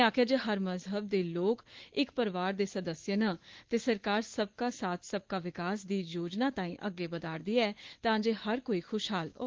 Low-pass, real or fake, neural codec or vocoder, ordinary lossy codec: 7.2 kHz; fake; autoencoder, 48 kHz, 128 numbers a frame, DAC-VAE, trained on Japanese speech; Opus, 16 kbps